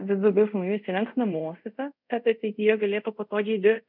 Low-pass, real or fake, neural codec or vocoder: 5.4 kHz; fake; codec, 24 kHz, 0.5 kbps, DualCodec